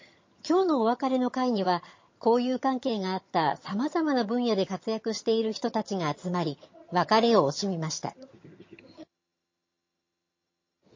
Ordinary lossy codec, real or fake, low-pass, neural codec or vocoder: MP3, 32 kbps; fake; 7.2 kHz; vocoder, 22.05 kHz, 80 mel bands, HiFi-GAN